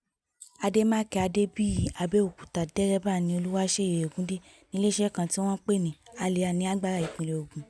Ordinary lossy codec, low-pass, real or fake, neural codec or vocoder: none; none; real; none